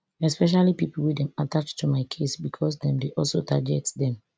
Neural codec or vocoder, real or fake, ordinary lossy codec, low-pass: none; real; none; none